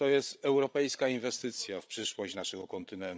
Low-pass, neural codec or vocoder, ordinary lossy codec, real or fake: none; codec, 16 kHz, 16 kbps, FreqCodec, larger model; none; fake